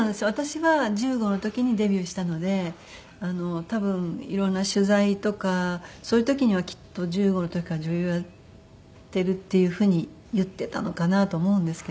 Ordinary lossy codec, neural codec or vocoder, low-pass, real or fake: none; none; none; real